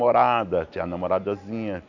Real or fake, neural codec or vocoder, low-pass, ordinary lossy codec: real; none; 7.2 kHz; none